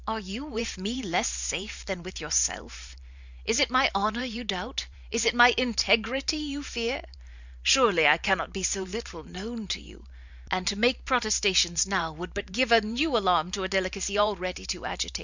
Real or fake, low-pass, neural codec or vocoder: fake; 7.2 kHz; vocoder, 44.1 kHz, 128 mel bands every 512 samples, BigVGAN v2